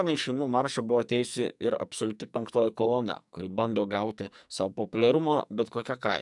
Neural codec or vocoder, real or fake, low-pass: codec, 44.1 kHz, 2.6 kbps, SNAC; fake; 10.8 kHz